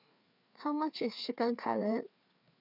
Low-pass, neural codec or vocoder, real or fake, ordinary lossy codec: 5.4 kHz; codec, 16 kHz, 4 kbps, FreqCodec, larger model; fake; none